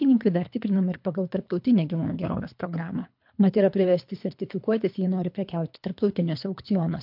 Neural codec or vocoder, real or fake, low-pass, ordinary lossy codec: codec, 24 kHz, 3 kbps, HILCodec; fake; 5.4 kHz; MP3, 48 kbps